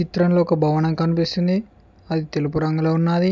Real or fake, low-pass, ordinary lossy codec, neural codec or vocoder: real; none; none; none